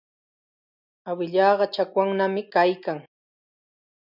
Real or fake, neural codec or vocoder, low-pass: real; none; 5.4 kHz